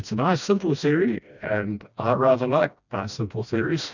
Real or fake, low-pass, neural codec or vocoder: fake; 7.2 kHz; codec, 16 kHz, 1 kbps, FreqCodec, smaller model